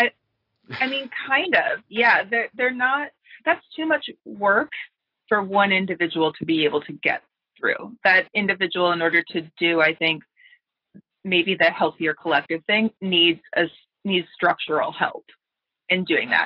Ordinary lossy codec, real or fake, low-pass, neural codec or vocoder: AAC, 32 kbps; real; 5.4 kHz; none